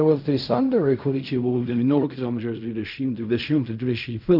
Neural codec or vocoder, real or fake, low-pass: codec, 16 kHz in and 24 kHz out, 0.4 kbps, LongCat-Audio-Codec, fine tuned four codebook decoder; fake; 5.4 kHz